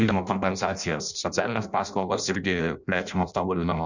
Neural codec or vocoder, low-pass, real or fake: codec, 16 kHz in and 24 kHz out, 0.6 kbps, FireRedTTS-2 codec; 7.2 kHz; fake